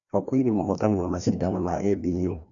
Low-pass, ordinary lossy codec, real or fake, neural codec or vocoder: 7.2 kHz; none; fake; codec, 16 kHz, 1 kbps, FreqCodec, larger model